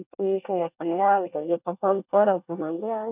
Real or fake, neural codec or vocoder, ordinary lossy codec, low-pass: fake; codec, 24 kHz, 1 kbps, SNAC; none; 3.6 kHz